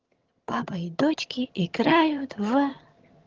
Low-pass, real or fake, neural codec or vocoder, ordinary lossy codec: 7.2 kHz; fake; vocoder, 22.05 kHz, 80 mel bands, HiFi-GAN; Opus, 16 kbps